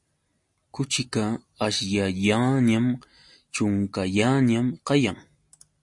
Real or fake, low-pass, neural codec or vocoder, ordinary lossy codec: real; 10.8 kHz; none; MP3, 48 kbps